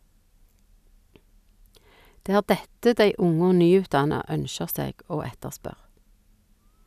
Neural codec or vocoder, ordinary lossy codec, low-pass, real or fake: none; none; 14.4 kHz; real